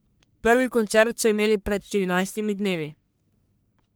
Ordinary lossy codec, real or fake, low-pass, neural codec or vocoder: none; fake; none; codec, 44.1 kHz, 1.7 kbps, Pupu-Codec